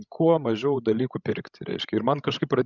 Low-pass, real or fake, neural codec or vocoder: 7.2 kHz; fake; codec, 16 kHz, 16 kbps, FreqCodec, larger model